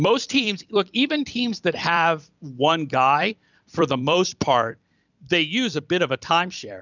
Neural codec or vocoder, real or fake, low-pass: vocoder, 44.1 kHz, 128 mel bands every 256 samples, BigVGAN v2; fake; 7.2 kHz